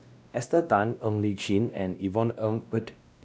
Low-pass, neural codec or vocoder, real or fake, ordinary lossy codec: none; codec, 16 kHz, 1 kbps, X-Codec, WavLM features, trained on Multilingual LibriSpeech; fake; none